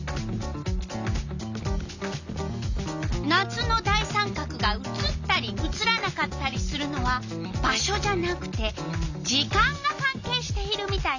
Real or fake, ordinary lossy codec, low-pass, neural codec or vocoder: real; none; 7.2 kHz; none